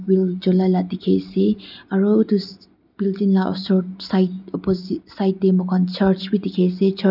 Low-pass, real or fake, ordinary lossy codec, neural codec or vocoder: 5.4 kHz; real; none; none